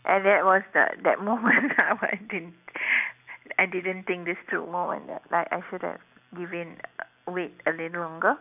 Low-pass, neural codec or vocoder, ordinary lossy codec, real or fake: 3.6 kHz; none; none; real